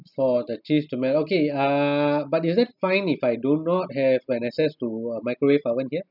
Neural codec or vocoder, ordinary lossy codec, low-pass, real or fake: none; none; 5.4 kHz; real